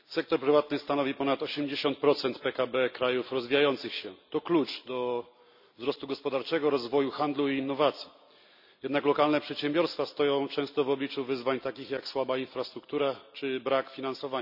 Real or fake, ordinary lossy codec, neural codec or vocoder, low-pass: real; none; none; 5.4 kHz